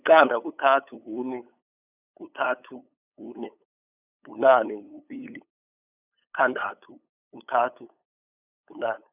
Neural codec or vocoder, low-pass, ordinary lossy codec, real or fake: codec, 16 kHz, 8 kbps, FunCodec, trained on LibriTTS, 25 frames a second; 3.6 kHz; none; fake